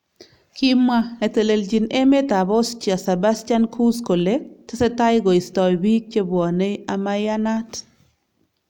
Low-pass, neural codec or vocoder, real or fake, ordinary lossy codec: 19.8 kHz; none; real; none